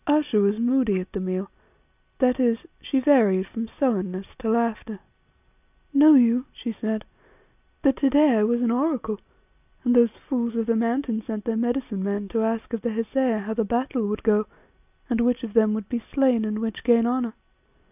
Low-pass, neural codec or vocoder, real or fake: 3.6 kHz; none; real